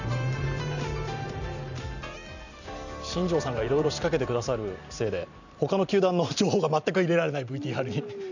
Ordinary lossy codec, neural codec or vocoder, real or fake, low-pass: none; vocoder, 44.1 kHz, 128 mel bands every 512 samples, BigVGAN v2; fake; 7.2 kHz